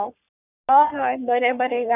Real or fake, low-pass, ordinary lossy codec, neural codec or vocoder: fake; 3.6 kHz; none; codec, 44.1 kHz, 3.4 kbps, Pupu-Codec